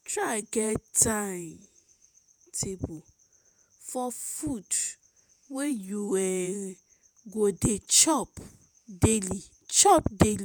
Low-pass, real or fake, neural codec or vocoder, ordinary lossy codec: none; fake; vocoder, 48 kHz, 128 mel bands, Vocos; none